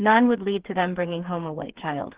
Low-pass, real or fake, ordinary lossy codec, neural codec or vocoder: 3.6 kHz; fake; Opus, 16 kbps; codec, 16 kHz, 4 kbps, FreqCodec, smaller model